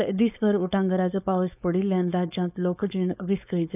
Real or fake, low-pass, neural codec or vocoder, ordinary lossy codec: fake; 3.6 kHz; codec, 16 kHz, 4.8 kbps, FACodec; none